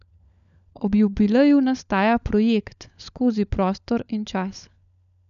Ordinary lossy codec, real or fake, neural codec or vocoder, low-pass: none; fake; codec, 16 kHz, 16 kbps, FunCodec, trained on LibriTTS, 50 frames a second; 7.2 kHz